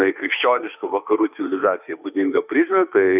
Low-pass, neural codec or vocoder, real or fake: 3.6 kHz; autoencoder, 48 kHz, 32 numbers a frame, DAC-VAE, trained on Japanese speech; fake